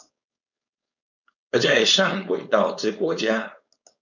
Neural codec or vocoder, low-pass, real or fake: codec, 16 kHz, 4.8 kbps, FACodec; 7.2 kHz; fake